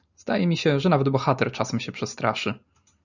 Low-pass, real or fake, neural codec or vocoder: 7.2 kHz; real; none